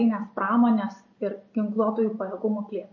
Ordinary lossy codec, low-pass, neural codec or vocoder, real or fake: MP3, 32 kbps; 7.2 kHz; none; real